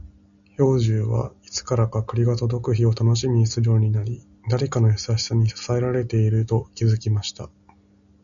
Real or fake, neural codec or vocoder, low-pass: real; none; 7.2 kHz